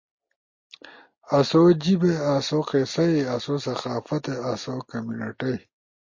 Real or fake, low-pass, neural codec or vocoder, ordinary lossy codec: real; 7.2 kHz; none; MP3, 32 kbps